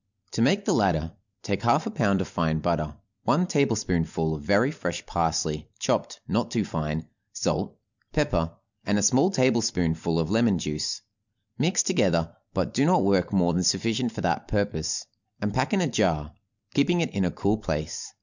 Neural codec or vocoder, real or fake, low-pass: none; real; 7.2 kHz